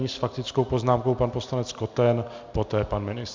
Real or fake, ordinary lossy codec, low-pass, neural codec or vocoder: real; MP3, 64 kbps; 7.2 kHz; none